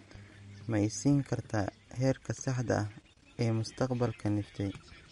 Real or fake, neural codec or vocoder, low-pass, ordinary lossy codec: real; none; 19.8 kHz; MP3, 48 kbps